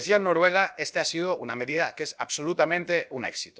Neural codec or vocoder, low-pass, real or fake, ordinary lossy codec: codec, 16 kHz, about 1 kbps, DyCAST, with the encoder's durations; none; fake; none